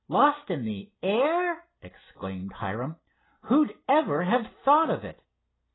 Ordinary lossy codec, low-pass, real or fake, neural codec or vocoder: AAC, 16 kbps; 7.2 kHz; real; none